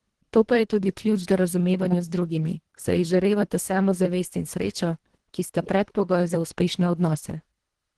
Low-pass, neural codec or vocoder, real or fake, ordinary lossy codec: 10.8 kHz; codec, 24 kHz, 1.5 kbps, HILCodec; fake; Opus, 16 kbps